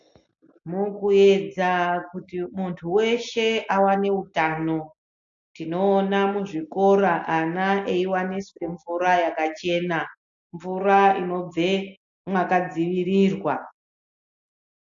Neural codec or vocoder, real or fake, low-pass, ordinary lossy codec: none; real; 7.2 kHz; AAC, 64 kbps